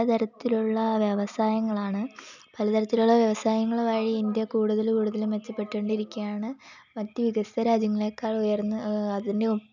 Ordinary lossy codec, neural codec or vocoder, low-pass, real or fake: none; none; 7.2 kHz; real